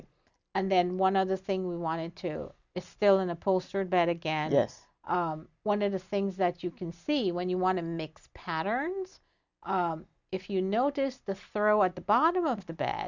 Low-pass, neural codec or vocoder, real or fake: 7.2 kHz; none; real